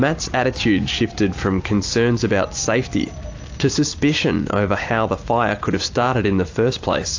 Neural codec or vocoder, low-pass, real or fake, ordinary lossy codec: vocoder, 44.1 kHz, 128 mel bands every 256 samples, BigVGAN v2; 7.2 kHz; fake; AAC, 48 kbps